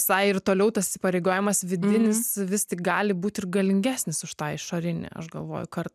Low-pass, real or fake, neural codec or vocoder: 14.4 kHz; real; none